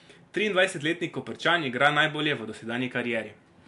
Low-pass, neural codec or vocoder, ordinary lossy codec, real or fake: 10.8 kHz; none; MP3, 64 kbps; real